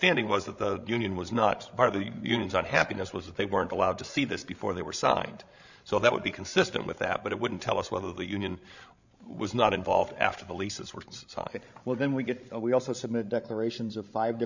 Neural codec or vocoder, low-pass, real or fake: codec, 16 kHz, 16 kbps, FreqCodec, larger model; 7.2 kHz; fake